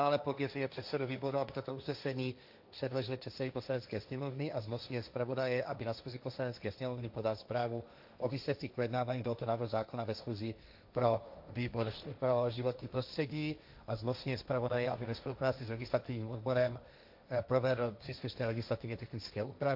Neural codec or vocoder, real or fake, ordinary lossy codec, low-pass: codec, 16 kHz, 1.1 kbps, Voila-Tokenizer; fake; AAC, 48 kbps; 5.4 kHz